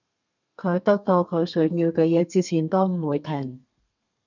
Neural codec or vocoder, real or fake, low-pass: codec, 32 kHz, 1.9 kbps, SNAC; fake; 7.2 kHz